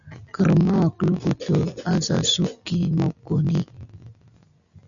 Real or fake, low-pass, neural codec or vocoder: real; 7.2 kHz; none